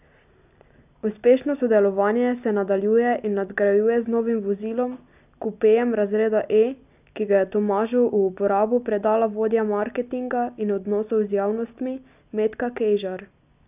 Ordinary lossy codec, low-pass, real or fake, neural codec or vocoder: none; 3.6 kHz; real; none